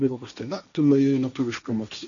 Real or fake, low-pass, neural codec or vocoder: fake; 7.2 kHz; codec, 16 kHz, 1.1 kbps, Voila-Tokenizer